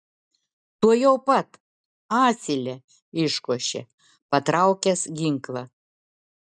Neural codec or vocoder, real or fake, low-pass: none; real; 9.9 kHz